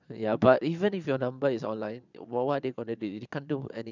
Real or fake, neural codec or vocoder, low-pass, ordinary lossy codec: fake; vocoder, 22.05 kHz, 80 mel bands, Vocos; 7.2 kHz; none